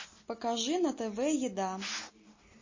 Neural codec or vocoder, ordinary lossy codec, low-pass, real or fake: none; MP3, 32 kbps; 7.2 kHz; real